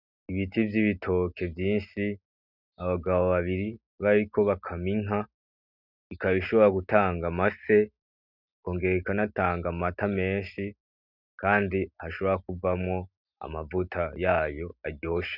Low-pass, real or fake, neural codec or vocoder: 5.4 kHz; real; none